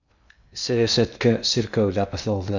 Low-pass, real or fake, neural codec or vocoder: 7.2 kHz; fake; codec, 16 kHz in and 24 kHz out, 0.6 kbps, FocalCodec, streaming, 2048 codes